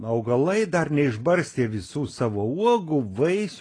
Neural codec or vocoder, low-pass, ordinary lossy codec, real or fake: none; 9.9 kHz; AAC, 32 kbps; real